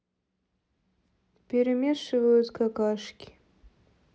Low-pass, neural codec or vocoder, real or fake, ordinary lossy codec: none; none; real; none